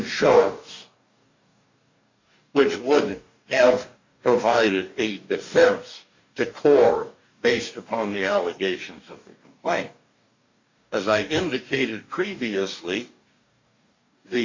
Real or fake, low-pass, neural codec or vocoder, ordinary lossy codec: fake; 7.2 kHz; codec, 44.1 kHz, 2.6 kbps, DAC; MP3, 64 kbps